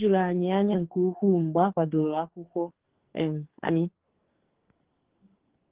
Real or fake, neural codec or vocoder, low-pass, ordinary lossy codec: fake; codec, 44.1 kHz, 2.6 kbps, SNAC; 3.6 kHz; Opus, 16 kbps